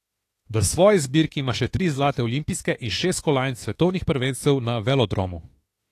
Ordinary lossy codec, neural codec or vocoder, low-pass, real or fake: AAC, 48 kbps; autoencoder, 48 kHz, 32 numbers a frame, DAC-VAE, trained on Japanese speech; 14.4 kHz; fake